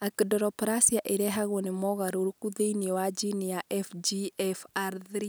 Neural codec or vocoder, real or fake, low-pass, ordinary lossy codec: none; real; none; none